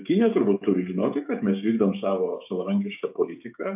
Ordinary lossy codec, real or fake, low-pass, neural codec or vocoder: AAC, 32 kbps; fake; 3.6 kHz; codec, 16 kHz, 16 kbps, FreqCodec, smaller model